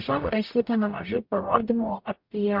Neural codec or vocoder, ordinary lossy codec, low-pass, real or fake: codec, 44.1 kHz, 0.9 kbps, DAC; MP3, 48 kbps; 5.4 kHz; fake